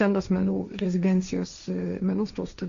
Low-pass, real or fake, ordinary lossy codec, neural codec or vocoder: 7.2 kHz; fake; Opus, 64 kbps; codec, 16 kHz, 1.1 kbps, Voila-Tokenizer